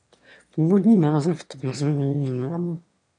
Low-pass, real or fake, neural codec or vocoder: 9.9 kHz; fake; autoencoder, 22.05 kHz, a latent of 192 numbers a frame, VITS, trained on one speaker